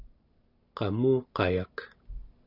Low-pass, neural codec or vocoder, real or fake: 5.4 kHz; none; real